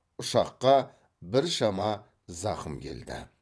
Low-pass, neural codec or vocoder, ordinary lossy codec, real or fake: none; vocoder, 22.05 kHz, 80 mel bands, WaveNeXt; none; fake